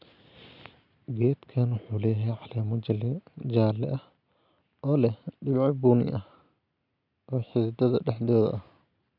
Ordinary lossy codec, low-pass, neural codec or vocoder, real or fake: none; 5.4 kHz; none; real